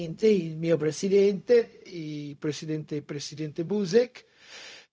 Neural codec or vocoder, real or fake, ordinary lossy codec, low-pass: codec, 16 kHz, 0.4 kbps, LongCat-Audio-Codec; fake; none; none